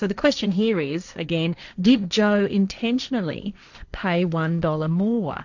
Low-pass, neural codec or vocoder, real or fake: 7.2 kHz; codec, 16 kHz, 1.1 kbps, Voila-Tokenizer; fake